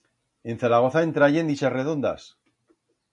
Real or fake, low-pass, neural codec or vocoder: real; 10.8 kHz; none